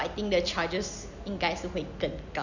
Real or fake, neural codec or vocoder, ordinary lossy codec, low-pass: real; none; none; 7.2 kHz